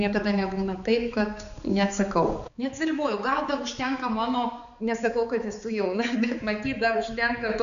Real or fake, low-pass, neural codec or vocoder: fake; 7.2 kHz; codec, 16 kHz, 4 kbps, X-Codec, HuBERT features, trained on balanced general audio